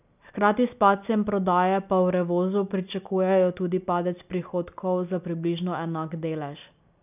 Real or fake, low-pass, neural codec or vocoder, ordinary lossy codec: real; 3.6 kHz; none; none